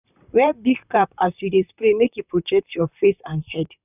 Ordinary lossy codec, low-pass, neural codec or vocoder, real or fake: none; 3.6 kHz; vocoder, 44.1 kHz, 128 mel bands, Pupu-Vocoder; fake